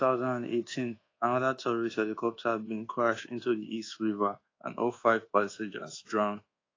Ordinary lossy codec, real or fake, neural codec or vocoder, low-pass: AAC, 32 kbps; fake; codec, 24 kHz, 1.2 kbps, DualCodec; 7.2 kHz